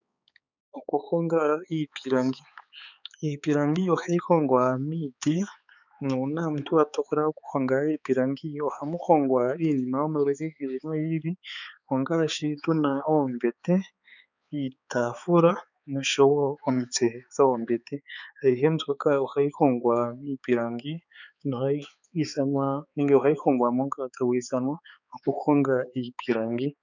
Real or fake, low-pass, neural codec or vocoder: fake; 7.2 kHz; codec, 16 kHz, 4 kbps, X-Codec, HuBERT features, trained on balanced general audio